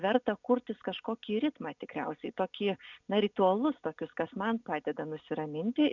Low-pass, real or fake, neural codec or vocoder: 7.2 kHz; real; none